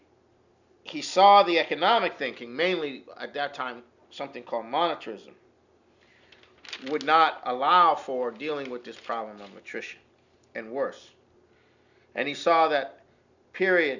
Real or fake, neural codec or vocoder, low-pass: real; none; 7.2 kHz